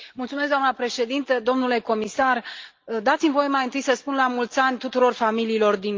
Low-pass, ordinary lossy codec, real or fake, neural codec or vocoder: 7.2 kHz; Opus, 32 kbps; real; none